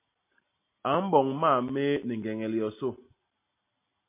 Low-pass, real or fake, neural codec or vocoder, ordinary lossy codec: 3.6 kHz; real; none; MP3, 24 kbps